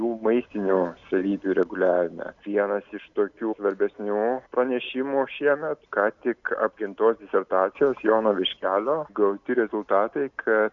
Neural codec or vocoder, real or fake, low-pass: none; real; 7.2 kHz